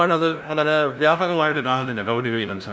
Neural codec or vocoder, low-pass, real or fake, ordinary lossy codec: codec, 16 kHz, 0.5 kbps, FunCodec, trained on LibriTTS, 25 frames a second; none; fake; none